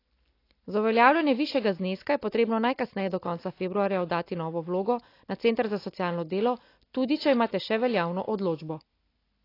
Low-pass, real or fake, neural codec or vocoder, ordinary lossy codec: 5.4 kHz; real; none; AAC, 32 kbps